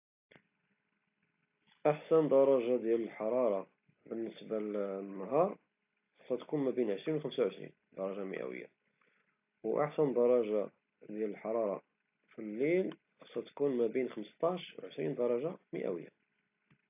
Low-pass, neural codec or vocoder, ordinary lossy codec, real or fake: 3.6 kHz; none; AAC, 32 kbps; real